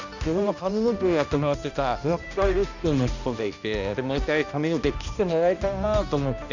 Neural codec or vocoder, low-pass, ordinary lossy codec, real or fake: codec, 16 kHz, 1 kbps, X-Codec, HuBERT features, trained on balanced general audio; 7.2 kHz; none; fake